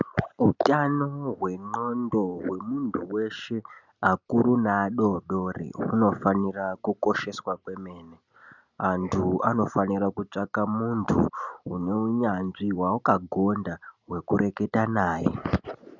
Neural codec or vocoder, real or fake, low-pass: none; real; 7.2 kHz